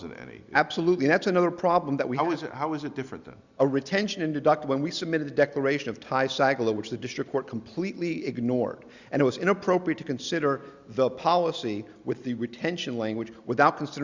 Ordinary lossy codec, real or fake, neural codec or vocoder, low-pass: Opus, 64 kbps; real; none; 7.2 kHz